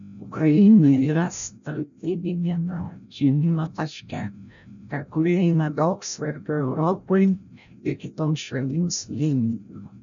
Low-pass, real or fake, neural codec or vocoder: 7.2 kHz; fake; codec, 16 kHz, 0.5 kbps, FreqCodec, larger model